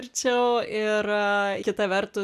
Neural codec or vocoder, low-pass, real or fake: none; 14.4 kHz; real